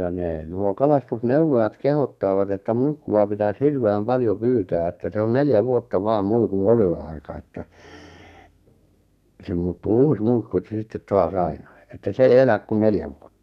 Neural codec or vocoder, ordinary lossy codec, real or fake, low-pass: codec, 32 kHz, 1.9 kbps, SNAC; none; fake; 14.4 kHz